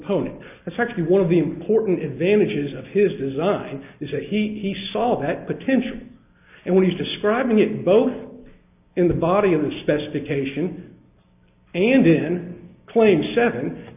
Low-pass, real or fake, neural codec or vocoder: 3.6 kHz; real; none